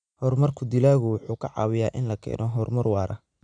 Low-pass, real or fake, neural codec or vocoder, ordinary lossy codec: 9.9 kHz; real; none; none